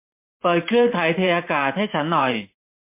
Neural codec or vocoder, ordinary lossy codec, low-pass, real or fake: vocoder, 44.1 kHz, 128 mel bands every 512 samples, BigVGAN v2; MP3, 24 kbps; 3.6 kHz; fake